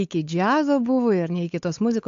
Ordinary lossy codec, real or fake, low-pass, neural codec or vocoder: AAC, 64 kbps; fake; 7.2 kHz; codec, 16 kHz, 8 kbps, FunCodec, trained on Chinese and English, 25 frames a second